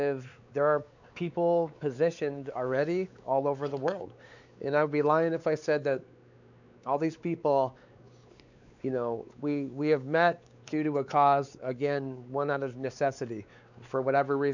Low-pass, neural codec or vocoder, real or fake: 7.2 kHz; codec, 16 kHz, 4 kbps, X-Codec, WavLM features, trained on Multilingual LibriSpeech; fake